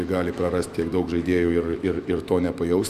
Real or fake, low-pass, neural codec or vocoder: fake; 14.4 kHz; autoencoder, 48 kHz, 128 numbers a frame, DAC-VAE, trained on Japanese speech